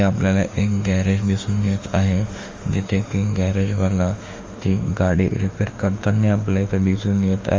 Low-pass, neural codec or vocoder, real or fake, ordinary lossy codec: 7.2 kHz; autoencoder, 48 kHz, 32 numbers a frame, DAC-VAE, trained on Japanese speech; fake; Opus, 32 kbps